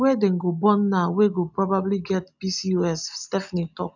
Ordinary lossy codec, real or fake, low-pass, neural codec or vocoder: none; real; 7.2 kHz; none